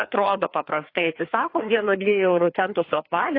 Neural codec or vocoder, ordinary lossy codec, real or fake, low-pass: codec, 16 kHz, 2 kbps, FreqCodec, larger model; AAC, 32 kbps; fake; 5.4 kHz